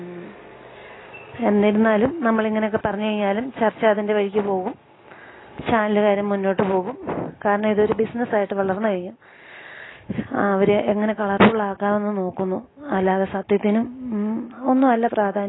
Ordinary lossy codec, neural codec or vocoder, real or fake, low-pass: AAC, 16 kbps; none; real; 7.2 kHz